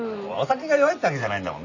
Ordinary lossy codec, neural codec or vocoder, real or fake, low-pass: none; none; real; 7.2 kHz